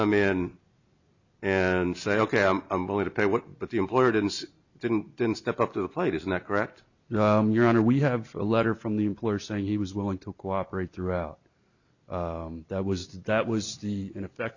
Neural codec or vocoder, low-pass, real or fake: none; 7.2 kHz; real